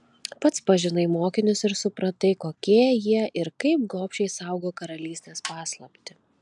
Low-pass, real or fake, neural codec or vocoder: 10.8 kHz; real; none